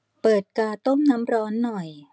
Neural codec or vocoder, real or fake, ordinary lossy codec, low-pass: none; real; none; none